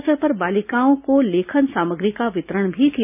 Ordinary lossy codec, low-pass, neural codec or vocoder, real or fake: none; 3.6 kHz; none; real